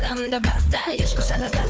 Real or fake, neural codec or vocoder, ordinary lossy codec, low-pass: fake; codec, 16 kHz, 4 kbps, FunCodec, trained on Chinese and English, 50 frames a second; none; none